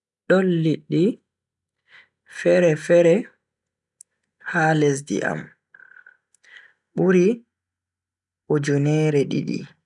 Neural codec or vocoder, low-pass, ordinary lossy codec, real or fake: none; 10.8 kHz; none; real